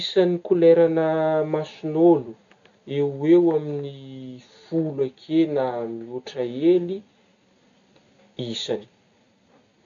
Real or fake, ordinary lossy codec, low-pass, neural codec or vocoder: real; none; 7.2 kHz; none